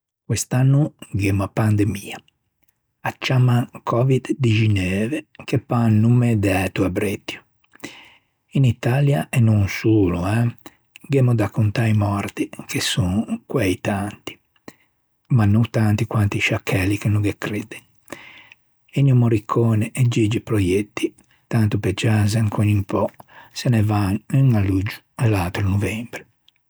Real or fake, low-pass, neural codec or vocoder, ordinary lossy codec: real; none; none; none